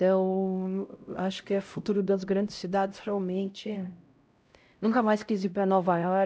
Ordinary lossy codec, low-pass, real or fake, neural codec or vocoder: none; none; fake; codec, 16 kHz, 0.5 kbps, X-Codec, HuBERT features, trained on LibriSpeech